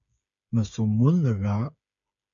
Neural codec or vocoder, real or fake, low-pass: codec, 16 kHz, 8 kbps, FreqCodec, smaller model; fake; 7.2 kHz